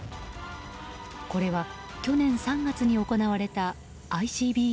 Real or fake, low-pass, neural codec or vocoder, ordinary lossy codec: real; none; none; none